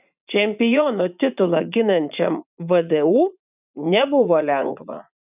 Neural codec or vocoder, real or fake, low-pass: vocoder, 44.1 kHz, 80 mel bands, Vocos; fake; 3.6 kHz